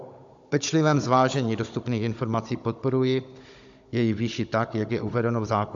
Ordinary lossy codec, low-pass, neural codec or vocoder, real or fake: AAC, 64 kbps; 7.2 kHz; codec, 16 kHz, 16 kbps, FunCodec, trained on Chinese and English, 50 frames a second; fake